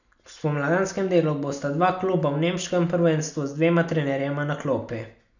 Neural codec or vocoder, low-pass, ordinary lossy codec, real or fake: none; 7.2 kHz; none; real